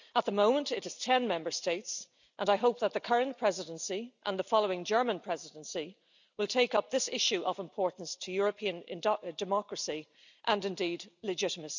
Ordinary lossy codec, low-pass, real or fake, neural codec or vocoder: none; 7.2 kHz; real; none